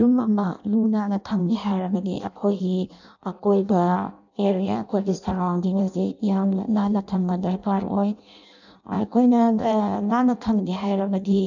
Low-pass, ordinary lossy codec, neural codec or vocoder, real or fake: 7.2 kHz; none; codec, 16 kHz in and 24 kHz out, 0.6 kbps, FireRedTTS-2 codec; fake